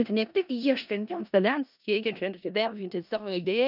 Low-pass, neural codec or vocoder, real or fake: 5.4 kHz; codec, 16 kHz in and 24 kHz out, 0.4 kbps, LongCat-Audio-Codec, four codebook decoder; fake